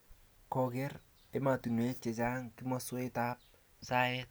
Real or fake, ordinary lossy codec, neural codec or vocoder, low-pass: real; none; none; none